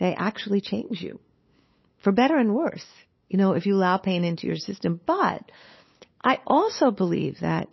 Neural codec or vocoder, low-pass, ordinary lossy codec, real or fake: codec, 16 kHz, 8 kbps, FunCodec, trained on LibriTTS, 25 frames a second; 7.2 kHz; MP3, 24 kbps; fake